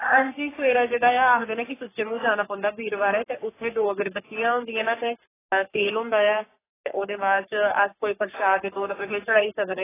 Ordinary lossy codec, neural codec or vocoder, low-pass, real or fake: AAC, 16 kbps; codec, 44.1 kHz, 3.4 kbps, Pupu-Codec; 3.6 kHz; fake